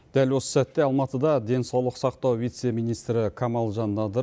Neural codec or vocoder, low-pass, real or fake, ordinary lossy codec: none; none; real; none